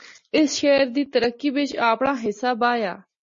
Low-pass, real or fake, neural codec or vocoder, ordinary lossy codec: 7.2 kHz; real; none; MP3, 32 kbps